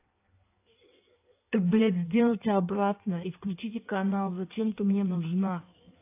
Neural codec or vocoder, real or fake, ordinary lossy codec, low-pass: codec, 16 kHz in and 24 kHz out, 1.1 kbps, FireRedTTS-2 codec; fake; AAC, 24 kbps; 3.6 kHz